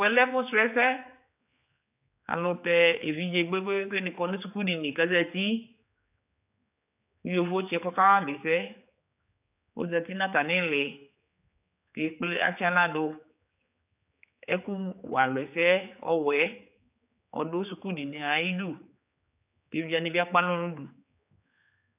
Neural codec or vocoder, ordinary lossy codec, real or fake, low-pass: codec, 16 kHz, 4 kbps, X-Codec, HuBERT features, trained on general audio; AAC, 32 kbps; fake; 3.6 kHz